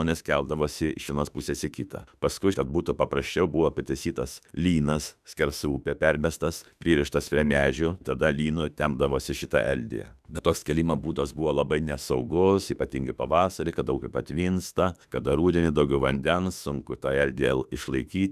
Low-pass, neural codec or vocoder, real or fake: 14.4 kHz; autoencoder, 48 kHz, 32 numbers a frame, DAC-VAE, trained on Japanese speech; fake